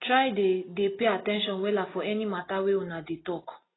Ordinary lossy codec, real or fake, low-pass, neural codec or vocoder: AAC, 16 kbps; real; 7.2 kHz; none